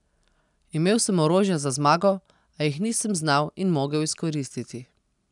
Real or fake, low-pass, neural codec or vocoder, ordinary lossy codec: real; 10.8 kHz; none; none